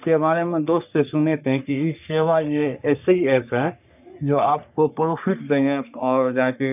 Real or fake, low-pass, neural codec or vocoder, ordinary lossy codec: fake; 3.6 kHz; codec, 44.1 kHz, 3.4 kbps, Pupu-Codec; none